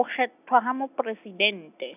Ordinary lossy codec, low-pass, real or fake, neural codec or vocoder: none; 3.6 kHz; real; none